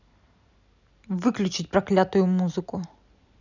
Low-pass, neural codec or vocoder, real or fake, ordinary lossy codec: 7.2 kHz; none; real; none